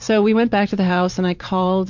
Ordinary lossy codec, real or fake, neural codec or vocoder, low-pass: MP3, 64 kbps; fake; vocoder, 44.1 kHz, 128 mel bands every 512 samples, BigVGAN v2; 7.2 kHz